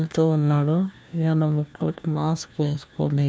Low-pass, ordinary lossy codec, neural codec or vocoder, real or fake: none; none; codec, 16 kHz, 1 kbps, FunCodec, trained on LibriTTS, 50 frames a second; fake